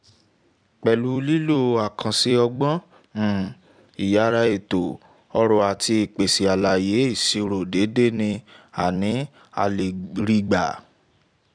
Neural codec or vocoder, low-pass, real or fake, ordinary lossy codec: vocoder, 44.1 kHz, 128 mel bands every 256 samples, BigVGAN v2; 9.9 kHz; fake; none